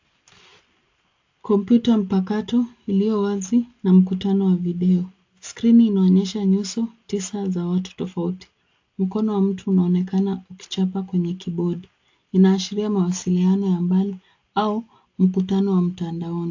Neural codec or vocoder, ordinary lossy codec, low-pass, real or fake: none; AAC, 48 kbps; 7.2 kHz; real